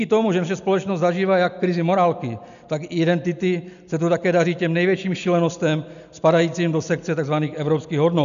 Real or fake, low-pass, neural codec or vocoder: real; 7.2 kHz; none